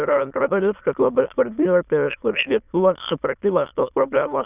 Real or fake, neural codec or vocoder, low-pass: fake; autoencoder, 22.05 kHz, a latent of 192 numbers a frame, VITS, trained on many speakers; 3.6 kHz